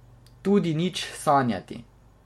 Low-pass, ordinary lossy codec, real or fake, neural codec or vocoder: 19.8 kHz; MP3, 64 kbps; real; none